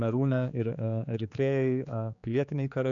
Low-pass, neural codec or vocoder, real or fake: 7.2 kHz; codec, 16 kHz, 4 kbps, X-Codec, HuBERT features, trained on general audio; fake